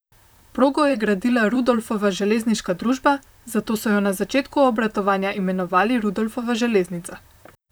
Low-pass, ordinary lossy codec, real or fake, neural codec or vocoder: none; none; fake; vocoder, 44.1 kHz, 128 mel bands, Pupu-Vocoder